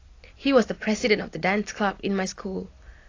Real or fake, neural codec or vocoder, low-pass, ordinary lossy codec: real; none; 7.2 kHz; AAC, 32 kbps